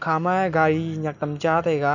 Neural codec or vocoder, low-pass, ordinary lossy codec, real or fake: none; 7.2 kHz; none; real